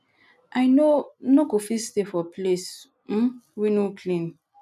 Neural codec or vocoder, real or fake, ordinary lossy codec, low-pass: none; real; none; 14.4 kHz